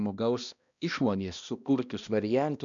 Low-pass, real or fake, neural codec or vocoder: 7.2 kHz; fake; codec, 16 kHz, 1 kbps, X-Codec, HuBERT features, trained on balanced general audio